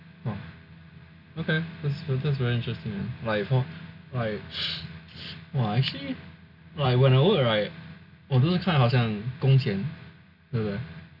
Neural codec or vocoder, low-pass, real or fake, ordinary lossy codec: none; 5.4 kHz; real; none